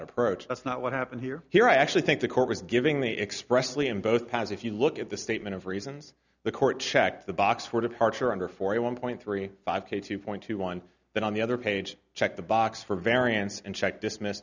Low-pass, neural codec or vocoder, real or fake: 7.2 kHz; none; real